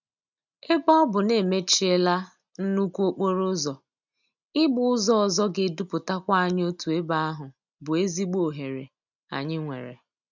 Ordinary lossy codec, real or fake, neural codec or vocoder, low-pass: none; real; none; 7.2 kHz